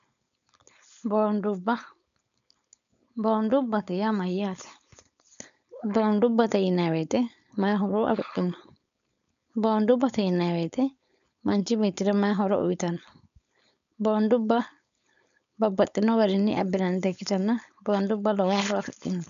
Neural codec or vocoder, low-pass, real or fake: codec, 16 kHz, 4.8 kbps, FACodec; 7.2 kHz; fake